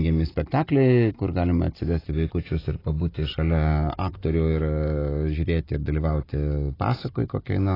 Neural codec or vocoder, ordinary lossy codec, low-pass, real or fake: none; AAC, 24 kbps; 5.4 kHz; real